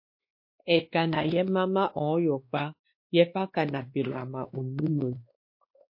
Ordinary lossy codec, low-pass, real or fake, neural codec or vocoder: MP3, 32 kbps; 5.4 kHz; fake; codec, 16 kHz, 2 kbps, X-Codec, WavLM features, trained on Multilingual LibriSpeech